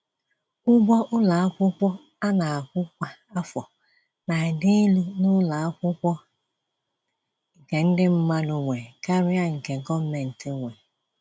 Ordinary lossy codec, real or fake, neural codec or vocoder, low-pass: none; real; none; none